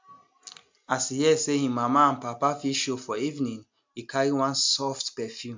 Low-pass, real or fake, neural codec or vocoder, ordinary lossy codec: 7.2 kHz; real; none; MP3, 64 kbps